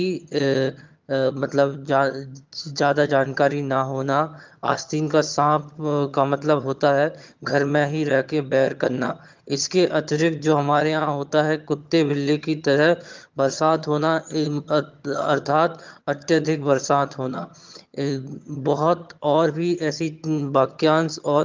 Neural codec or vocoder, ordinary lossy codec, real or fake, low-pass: vocoder, 22.05 kHz, 80 mel bands, HiFi-GAN; Opus, 24 kbps; fake; 7.2 kHz